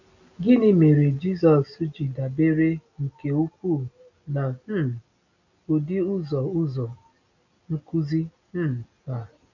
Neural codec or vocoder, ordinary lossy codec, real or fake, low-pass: none; none; real; 7.2 kHz